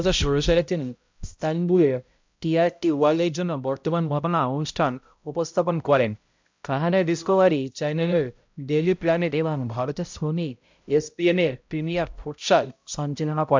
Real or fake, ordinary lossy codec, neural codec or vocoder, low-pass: fake; MP3, 64 kbps; codec, 16 kHz, 0.5 kbps, X-Codec, HuBERT features, trained on balanced general audio; 7.2 kHz